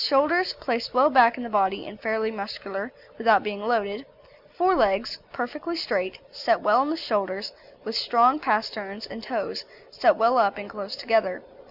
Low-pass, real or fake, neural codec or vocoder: 5.4 kHz; real; none